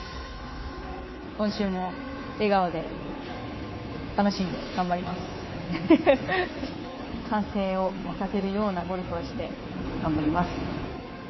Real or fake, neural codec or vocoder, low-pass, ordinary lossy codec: fake; codec, 24 kHz, 3.1 kbps, DualCodec; 7.2 kHz; MP3, 24 kbps